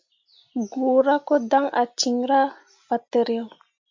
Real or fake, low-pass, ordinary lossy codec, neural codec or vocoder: real; 7.2 kHz; MP3, 48 kbps; none